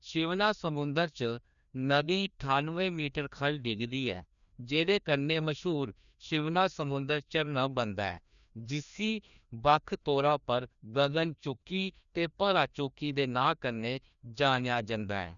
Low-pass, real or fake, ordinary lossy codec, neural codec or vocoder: 7.2 kHz; fake; none; codec, 16 kHz, 1 kbps, FreqCodec, larger model